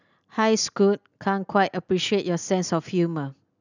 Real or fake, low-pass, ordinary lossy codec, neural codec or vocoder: real; 7.2 kHz; none; none